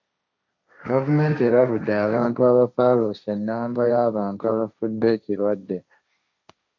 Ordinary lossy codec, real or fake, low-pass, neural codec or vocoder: AAC, 48 kbps; fake; 7.2 kHz; codec, 16 kHz, 1.1 kbps, Voila-Tokenizer